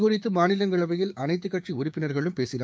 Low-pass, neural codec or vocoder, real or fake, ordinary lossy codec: none; codec, 16 kHz, 6 kbps, DAC; fake; none